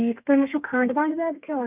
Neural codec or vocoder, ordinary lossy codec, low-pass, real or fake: codec, 32 kHz, 1.9 kbps, SNAC; none; 3.6 kHz; fake